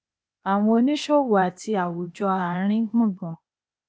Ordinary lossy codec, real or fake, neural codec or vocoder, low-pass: none; fake; codec, 16 kHz, 0.8 kbps, ZipCodec; none